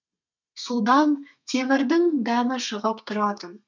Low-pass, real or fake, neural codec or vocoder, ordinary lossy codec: 7.2 kHz; fake; codec, 32 kHz, 1.9 kbps, SNAC; none